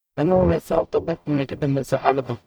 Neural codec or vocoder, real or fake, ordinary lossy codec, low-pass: codec, 44.1 kHz, 0.9 kbps, DAC; fake; none; none